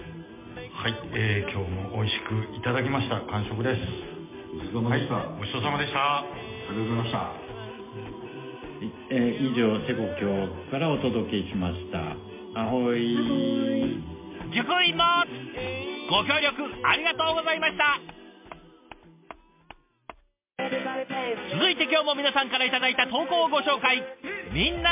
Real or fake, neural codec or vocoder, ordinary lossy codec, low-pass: real; none; MP3, 32 kbps; 3.6 kHz